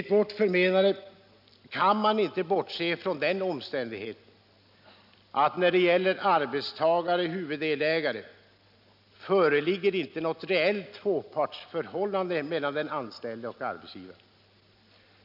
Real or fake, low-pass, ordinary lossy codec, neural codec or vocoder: real; 5.4 kHz; none; none